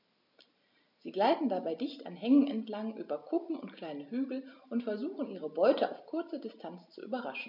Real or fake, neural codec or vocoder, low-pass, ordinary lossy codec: real; none; 5.4 kHz; none